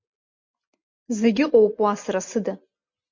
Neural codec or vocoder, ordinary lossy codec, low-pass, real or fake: none; MP3, 48 kbps; 7.2 kHz; real